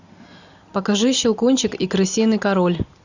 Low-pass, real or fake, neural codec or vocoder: 7.2 kHz; real; none